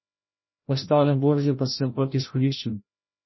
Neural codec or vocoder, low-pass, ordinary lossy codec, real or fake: codec, 16 kHz, 0.5 kbps, FreqCodec, larger model; 7.2 kHz; MP3, 24 kbps; fake